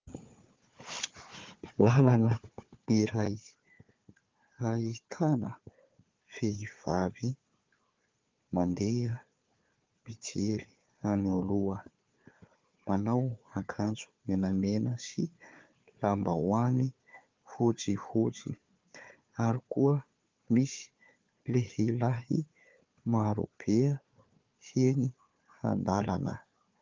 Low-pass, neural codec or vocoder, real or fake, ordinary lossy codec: 7.2 kHz; codec, 16 kHz, 4 kbps, FunCodec, trained on Chinese and English, 50 frames a second; fake; Opus, 24 kbps